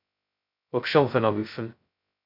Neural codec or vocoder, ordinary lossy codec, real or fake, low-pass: codec, 16 kHz, 0.2 kbps, FocalCodec; MP3, 48 kbps; fake; 5.4 kHz